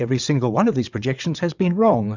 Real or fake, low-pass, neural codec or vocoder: fake; 7.2 kHz; vocoder, 22.05 kHz, 80 mel bands, WaveNeXt